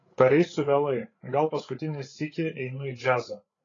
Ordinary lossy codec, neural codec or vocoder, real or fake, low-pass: AAC, 32 kbps; codec, 16 kHz, 8 kbps, FreqCodec, larger model; fake; 7.2 kHz